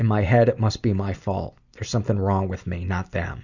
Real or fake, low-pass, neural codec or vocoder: real; 7.2 kHz; none